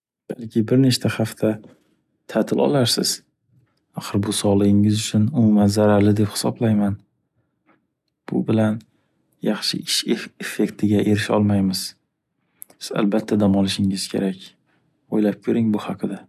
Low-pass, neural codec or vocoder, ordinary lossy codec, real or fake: 14.4 kHz; none; none; real